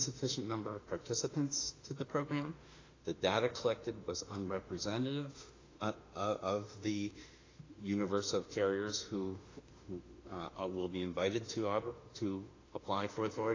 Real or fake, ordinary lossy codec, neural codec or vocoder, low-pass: fake; AAC, 32 kbps; autoencoder, 48 kHz, 32 numbers a frame, DAC-VAE, trained on Japanese speech; 7.2 kHz